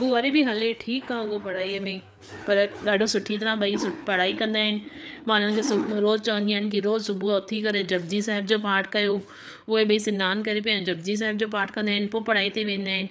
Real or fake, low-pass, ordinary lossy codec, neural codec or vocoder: fake; none; none; codec, 16 kHz, 4 kbps, FreqCodec, larger model